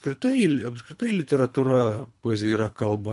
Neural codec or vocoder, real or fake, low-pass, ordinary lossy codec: codec, 24 kHz, 3 kbps, HILCodec; fake; 10.8 kHz; MP3, 64 kbps